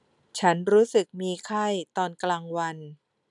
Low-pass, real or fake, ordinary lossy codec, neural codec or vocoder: 10.8 kHz; real; none; none